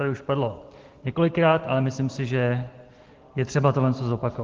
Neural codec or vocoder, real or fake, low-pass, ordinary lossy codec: none; real; 7.2 kHz; Opus, 16 kbps